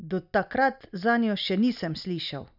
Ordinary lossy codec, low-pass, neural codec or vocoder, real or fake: none; 5.4 kHz; none; real